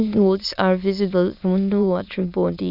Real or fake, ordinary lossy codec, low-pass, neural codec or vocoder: fake; none; 5.4 kHz; autoencoder, 22.05 kHz, a latent of 192 numbers a frame, VITS, trained on many speakers